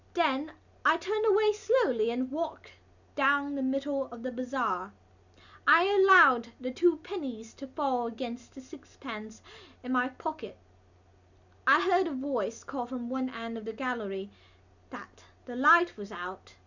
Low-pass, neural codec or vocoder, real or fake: 7.2 kHz; none; real